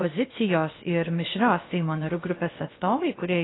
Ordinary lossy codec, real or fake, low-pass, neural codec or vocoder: AAC, 16 kbps; fake; 7.2 kHz; codec, 16 kHz, about 1 kbps, DyCAST, with the encoder's durations